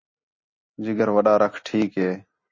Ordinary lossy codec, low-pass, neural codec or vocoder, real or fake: MP3, 32 kbps; 7.2 kHz; none; real